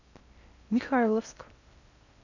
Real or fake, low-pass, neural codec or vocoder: fake; 7.2 kHz; codec, 16 kHz in and 24 kHz out, 0.6 kbps, FocalCodec, streaming, 2048 codes